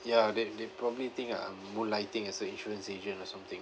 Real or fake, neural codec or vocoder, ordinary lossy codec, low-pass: real; none; none; none